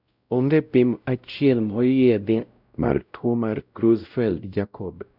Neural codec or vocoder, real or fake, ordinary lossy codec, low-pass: codec, 16 kHz, 0.5 kbps, X-Codec, WavLM features, trained on Multilingual LibriSpeech; fake; none; 5.4 kHz